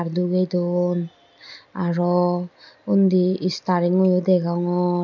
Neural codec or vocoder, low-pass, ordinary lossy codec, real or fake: none; 7.2 kHz; none; real